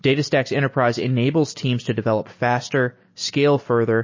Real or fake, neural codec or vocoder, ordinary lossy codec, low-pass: real; none; MP3, 32 kbps; 7.2 kHz